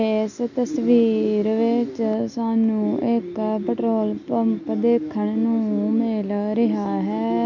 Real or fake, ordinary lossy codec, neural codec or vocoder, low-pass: real; none; none; 7.2 kHz